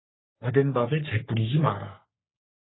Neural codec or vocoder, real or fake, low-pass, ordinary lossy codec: codec, 44.1 kHz, 3.4 kbps, Pupu-Codec; fake; 7.2 kHz; AAC, 16 kbps